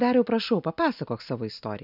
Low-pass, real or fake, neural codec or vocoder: 5.4 kHz; real; none